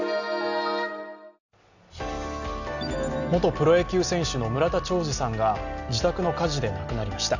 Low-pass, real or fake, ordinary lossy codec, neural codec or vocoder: 7.2 kHz; real; none; none